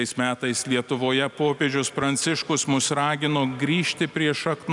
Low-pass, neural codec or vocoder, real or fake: 10.8 kHz; none; real